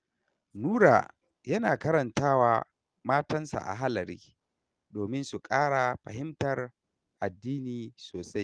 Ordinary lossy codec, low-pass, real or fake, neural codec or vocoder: Opus, 24 kbps; 9.9 kHz; real; none